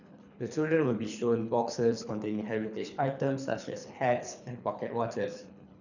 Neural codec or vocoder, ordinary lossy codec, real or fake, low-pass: codec, 24 kHz, 3 kbps, HILCodec; none; fake; 7.2 kHz